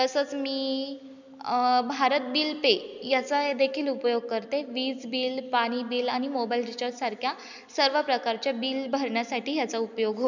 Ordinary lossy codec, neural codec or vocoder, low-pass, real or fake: none; none; 7.2 kHz; real